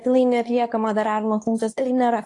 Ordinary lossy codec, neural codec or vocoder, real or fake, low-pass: AAC, 48 kbps; codec, 24 kHz, 0.9 kbps, WavTokenizer, medium speech release version 2; fake; 10.8 kHz